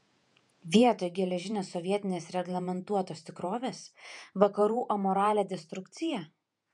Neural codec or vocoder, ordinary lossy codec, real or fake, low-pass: vocoder, 48 kHz, 128 mel bands, Vocos; MP3, 96 kbps; fake; 10.8 kHz